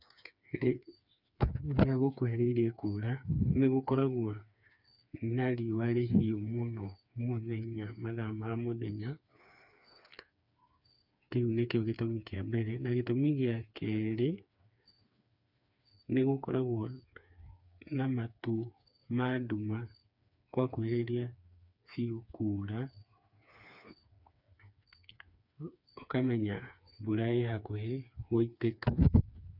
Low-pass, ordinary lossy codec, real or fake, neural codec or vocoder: 5.4 kHz; none; fake; codec, 16 kHz, 4 kbps, FreqCodec, smaller model